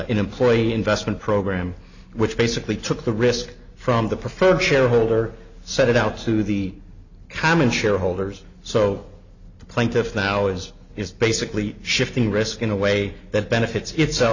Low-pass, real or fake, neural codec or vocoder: 7.2 kHz; real; none